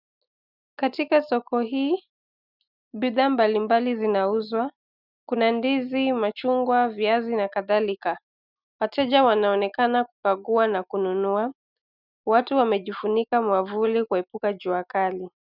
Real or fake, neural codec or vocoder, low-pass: real; none; 5.4 kHz